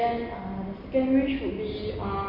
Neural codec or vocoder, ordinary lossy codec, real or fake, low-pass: none; none; real; 5.4 kHz